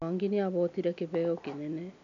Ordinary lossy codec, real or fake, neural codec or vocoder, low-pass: none; real; none; 7.2 kHz